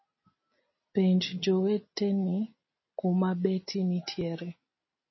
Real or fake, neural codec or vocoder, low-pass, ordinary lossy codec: real; none; 7.2 kHz; MP3, 24 kbps